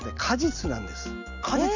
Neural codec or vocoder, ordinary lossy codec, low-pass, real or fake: none; none; 7.2 kHz; real